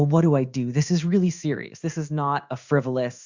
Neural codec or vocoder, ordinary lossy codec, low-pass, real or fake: codec, 16 kHz in and 24 kHz out, 1 kbps, XY-Tokenizer; Opus, 64 kbps; 7.2 kHz; fake